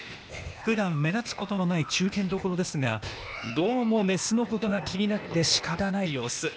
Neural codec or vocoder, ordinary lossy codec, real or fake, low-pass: codec, 16 kHz, 0.8 kbps, ZipCodec; none; fake; none